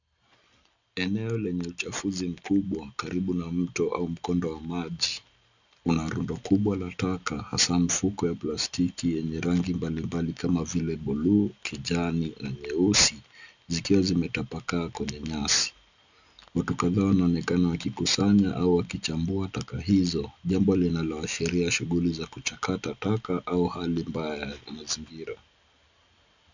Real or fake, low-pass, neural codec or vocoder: real; 7.2 kHz; none